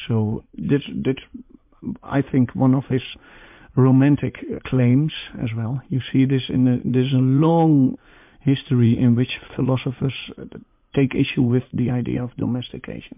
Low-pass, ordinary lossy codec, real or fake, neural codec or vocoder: 3.6 kHz; MP3, 32 kbps; fake; vocoder, 44.1 kHz, 80 mel bands, Vocos